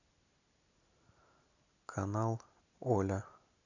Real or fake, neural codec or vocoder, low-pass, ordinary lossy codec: real; none; 7.2 kHz; none